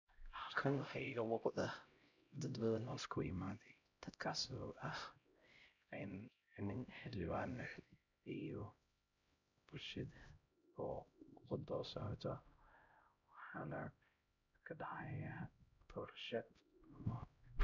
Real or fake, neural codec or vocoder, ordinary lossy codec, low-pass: fake; codec, 16 kHz, 0.5 kbps, X-Codec, HuBERT features, trained on LibriSpeech; none; 7.2 kHz